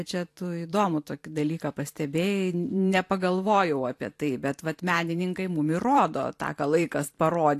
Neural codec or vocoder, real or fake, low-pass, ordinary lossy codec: vocoder, 44.1 kHz, 128 mel bands every 512 samples, BigVGAN v2; fake; 14.4 kHz; AAC, 64 kbps